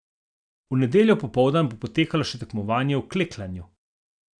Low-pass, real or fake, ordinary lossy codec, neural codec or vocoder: 9.9 kHz; real; none; none